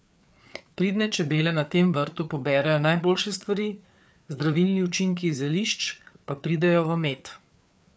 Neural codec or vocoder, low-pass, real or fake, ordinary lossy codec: codec, 16 kHz, 4 kbps, FreqCodec, larger model; none; fake; none